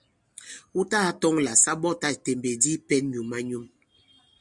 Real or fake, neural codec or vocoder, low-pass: real; none; 10.8 kHz